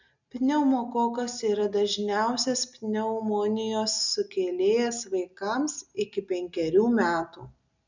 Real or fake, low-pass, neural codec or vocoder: real; 7.2 kHz; none